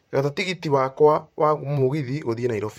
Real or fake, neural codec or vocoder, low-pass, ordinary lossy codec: fake; vocoder, 44.1 kHz, 128 mel bands, Pupu-Vocoder; 19.8 kHz; MP3, 64 kbps